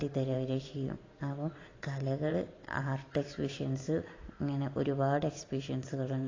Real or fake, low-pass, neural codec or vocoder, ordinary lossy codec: fake; 7.2 kHz; vocoder, 44.1 kHz, 128 mel bands every 512 samples, BigVGAN v2; AAC, 32 kbps